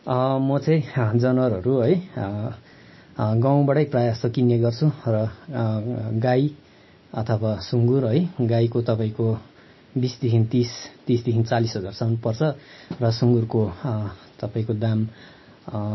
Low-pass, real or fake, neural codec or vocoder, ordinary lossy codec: 7.2 kHz; real; none; MP3, 24 kbps